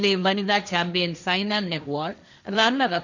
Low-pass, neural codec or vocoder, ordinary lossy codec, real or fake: 7.2 kHz; codec, 16 kHz, 1.1 kbps, Voila-Tokenizer; none; fake